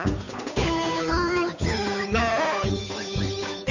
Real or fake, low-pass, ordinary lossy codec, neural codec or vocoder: fake; 7.2 kHz; Opus, 64 kbps; codec, 16 kHz, 8 kbps, FunCodec, trained on Chinese and English, 25 frames a second